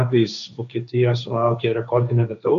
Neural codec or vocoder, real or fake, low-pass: codec, 16 kHz, 1.1 kbps, Voila-Tokenizer; fake; 7.2 kHz